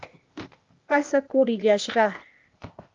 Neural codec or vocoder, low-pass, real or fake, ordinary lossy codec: codec, 16 kHz, 0.8 kbps, ZipCodec; 7.2 kHz; fake; Opus, 32 kbps